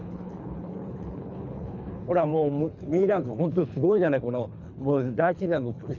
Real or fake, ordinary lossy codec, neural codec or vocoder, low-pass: fake; none; codec, 24 kHz, 3 kbps, HILCodec; 7.2 kHz